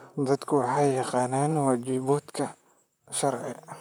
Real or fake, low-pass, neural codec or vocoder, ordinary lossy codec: fake; none; vocoder, 44.1 kHz, 128 mel bands, Pupu-Vocoder; none